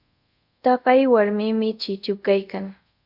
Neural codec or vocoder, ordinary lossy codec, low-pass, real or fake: codec, 24 kHz, 0.5 kbps, DualCodec; Opus, 64 kbps; 5.4 kHz; fake